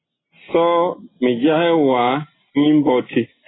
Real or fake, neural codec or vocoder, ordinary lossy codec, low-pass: real; none; AAC, 16 kbps; 7.2 kHz